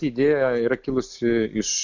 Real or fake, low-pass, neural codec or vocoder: real; 7.2 kHz; none